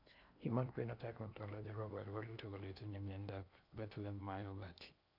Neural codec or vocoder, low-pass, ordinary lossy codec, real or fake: codec, 16 kHz in and 24 kHz out, 0.8 kbps, FocalCodec, streaming, 65536 codes; 5.4 kHz; none; fake